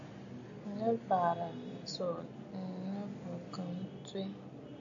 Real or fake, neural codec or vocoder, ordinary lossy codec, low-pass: real; none; AAC, 64 kbps; 7.2 kHz